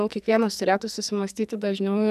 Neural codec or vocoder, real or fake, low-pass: codec, 44.1 kHz, 2.6 kbps, SNAC; fake; 14.4 kHz